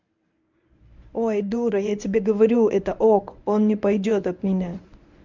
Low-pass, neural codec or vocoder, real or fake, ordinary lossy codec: 7.2 kHz; codec, 24 kHz, 0.9 kbps, WavTokenizer, medium speech release version 1; fake; none